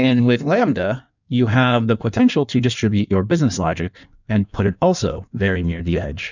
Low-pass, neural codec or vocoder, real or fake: 7.2 kHz; codec, 16 kHz in and 24 kHz out, 1.1 kbps, FireRedTTS-2 codec; fake